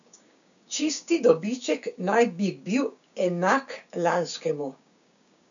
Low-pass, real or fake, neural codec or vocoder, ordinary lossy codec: 7.2 kHz; fake; codec, 16 kHz, 6 kbps, DAC; none